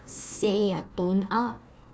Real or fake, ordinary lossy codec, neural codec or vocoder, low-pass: fake; none; codec, 16 kHz, 1 kbps, FunCodec, trained on Chinese and English, 50 frames a second; none